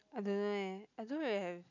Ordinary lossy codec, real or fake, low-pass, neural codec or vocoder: none; real; 7.2 kHz; none